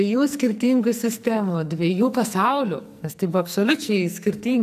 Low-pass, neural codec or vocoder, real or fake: 14.4 kHz; codec, 44.1 kHz, 2.6 kbps, SNAC; fake